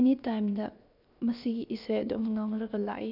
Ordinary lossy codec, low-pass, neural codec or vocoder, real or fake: none; 5.4 kHz; codec, 16 kHz, 0.7 kbps, FocalCodec; fake